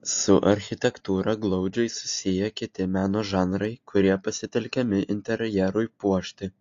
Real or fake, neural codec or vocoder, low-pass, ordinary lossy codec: real; none; 7.2 kHz; AAC, 48 kbps